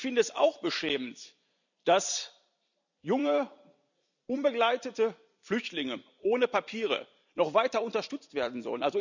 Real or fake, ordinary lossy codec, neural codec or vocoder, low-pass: fake; none; vocoder, 44.1 kHz, 128 mel bands every 256 samples, BigVGAN v2; 7.2 kHz